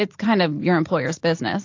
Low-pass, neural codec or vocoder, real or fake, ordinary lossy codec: 7.2 kHz; none; real; AAC, 48 kbps